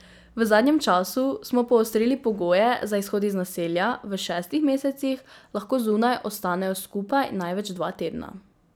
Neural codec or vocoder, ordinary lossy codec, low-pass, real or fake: none; none; none; real